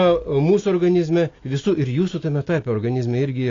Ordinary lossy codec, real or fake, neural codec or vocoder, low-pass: AAC, 32 kbps; real; none; 7.2 kHz